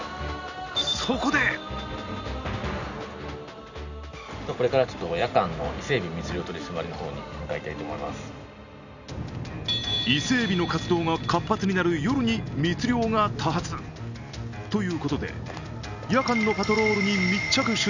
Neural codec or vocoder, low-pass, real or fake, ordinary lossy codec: none; 7.2 kHz; real; none